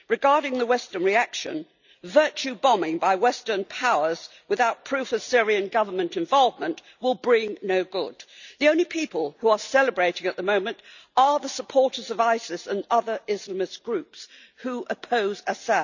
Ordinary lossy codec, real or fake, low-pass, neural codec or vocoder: none; real; 7.2 kHz; none